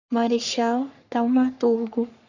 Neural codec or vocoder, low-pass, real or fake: codec, 44.1 kHz, 3.4 kbps, Pupu-Codec; 7.2 kHz; fake